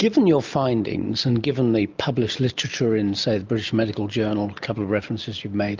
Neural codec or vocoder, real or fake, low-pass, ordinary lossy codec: none; real; 7.2 kHz; Opus, 24 kbps